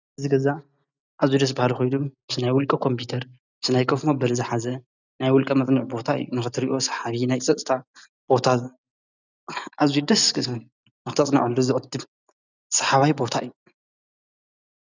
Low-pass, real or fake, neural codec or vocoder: 7.2 kHz; real; none